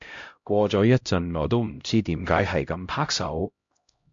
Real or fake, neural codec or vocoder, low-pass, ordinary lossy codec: fake; codec, 16 kHz, 0.5 kbps, X-Codec, HuBERT features, trained on LibriSpeech; 7.2 kHz; MP3, 48 kbps